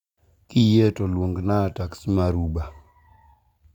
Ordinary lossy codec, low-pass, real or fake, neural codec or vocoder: none; 19.8 kHz; real; none